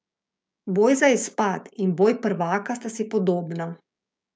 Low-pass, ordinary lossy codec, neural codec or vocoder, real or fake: none; none; codec, 16 kHz, 6 kbps, DAC; fake